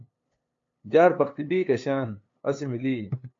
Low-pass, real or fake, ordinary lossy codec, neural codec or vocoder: 7.2 kHz; fake; AAC, 48 kbps; codec, 16 kHz, 2 kbps, FunCodec, trained on LibriTTS, 25 frames a second